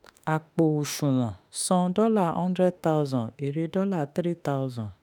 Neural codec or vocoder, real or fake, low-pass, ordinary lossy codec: autoencoder, 48 kHz, 32 numbers a frame, DAC-VAE, trained on Japanese speech; fake; none; none